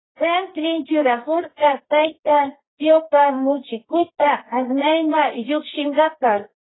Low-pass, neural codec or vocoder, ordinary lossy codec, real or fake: 7.2 kHz; codec, 24 kHz, 0.9 kbps, WavTokenizer, medium music audio release; AAC, 16 kbps; fake